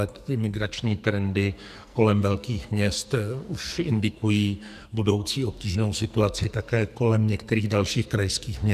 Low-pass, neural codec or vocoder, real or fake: 14.4 kHz; codec, 44.1 kHz, 2.6 kbps, SNAC; fake